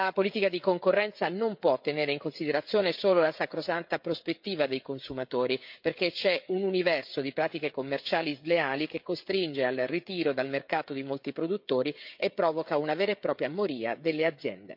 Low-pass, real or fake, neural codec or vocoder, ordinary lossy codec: 5.4 kHz; fake; codec, 16 kHz, 16 kbps, FreqCodec, smaller model; MP3, 48 kbps